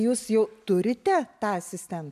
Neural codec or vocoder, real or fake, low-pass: none; real; 14.4 kHz